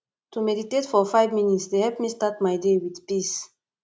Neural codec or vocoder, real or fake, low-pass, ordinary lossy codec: none; real; none; none